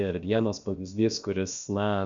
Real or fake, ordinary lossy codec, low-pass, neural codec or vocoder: fake; Opus, 64 kbps; 7.2 kHz; codec, 16 kHz, about 1 kbps, DyCAST, with the encoder's durations